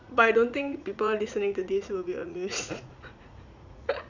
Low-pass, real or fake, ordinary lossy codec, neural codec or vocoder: 7.2 kHz; fake; none; vocoder, 44.1 kHz, 80 mel bands, Vocos